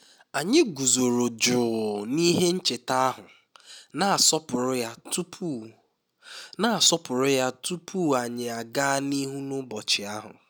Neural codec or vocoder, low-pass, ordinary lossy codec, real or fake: none; none; none; real